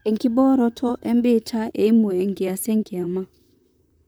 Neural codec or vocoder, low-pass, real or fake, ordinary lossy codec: vocoder, 44.1 kHz, 128 mel bands, Pupu-Vocoder; none; fake; none